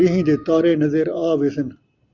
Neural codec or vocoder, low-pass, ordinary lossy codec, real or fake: none; 7.2 kHz; Opus, 64 kbps; real